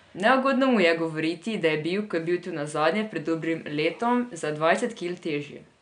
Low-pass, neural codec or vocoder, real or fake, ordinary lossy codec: 9.9 kHz; none; real; none